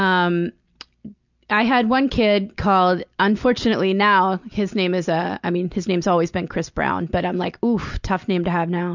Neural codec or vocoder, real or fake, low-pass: none; real; 7.2 kHz